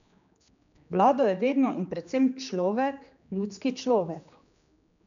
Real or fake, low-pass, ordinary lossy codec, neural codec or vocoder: fake; 7.2 kHz; none; codec, 16 kHz, 2 kbps, X-Codec, HuBERT features, trained on general audio